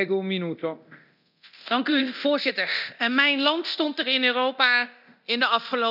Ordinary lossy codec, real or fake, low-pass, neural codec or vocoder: none; fake; 5.4 kHz; codec, 24 kHz, 0.9 kbps, DualCodec